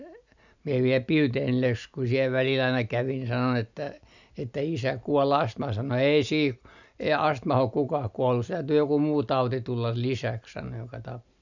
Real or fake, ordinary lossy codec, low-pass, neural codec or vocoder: real; none; 7.2 kHz; none